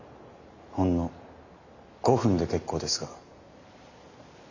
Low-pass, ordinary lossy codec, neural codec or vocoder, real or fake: 7.2 kHz; none; none; real